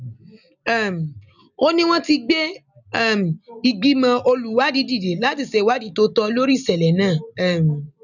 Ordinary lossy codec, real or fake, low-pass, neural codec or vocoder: none; real; 7.2 kHz; none